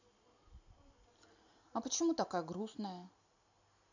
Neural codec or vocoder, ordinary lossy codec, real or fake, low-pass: none; none; real; 7.2 kHz